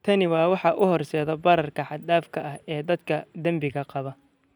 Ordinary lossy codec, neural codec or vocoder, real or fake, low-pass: none; none; real; 19.8 kHz